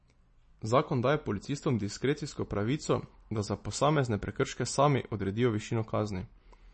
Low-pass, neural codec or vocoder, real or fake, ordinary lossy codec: 10.8 kHz; none; real; MP3, 32 kbps